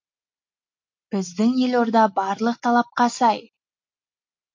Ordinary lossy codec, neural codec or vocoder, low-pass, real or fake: MP3, 48 kbps; none; 7.2 kHz; real